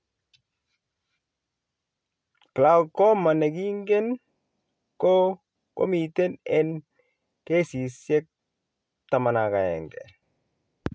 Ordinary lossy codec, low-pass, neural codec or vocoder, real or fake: none; none; none; real